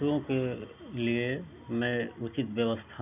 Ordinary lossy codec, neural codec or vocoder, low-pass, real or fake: none; none; 3.6 kHz; real